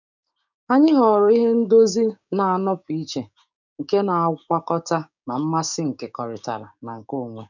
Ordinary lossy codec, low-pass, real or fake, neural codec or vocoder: none; 7.2 kHz; fake; codec, 16 kHz, 6 kbps, DAC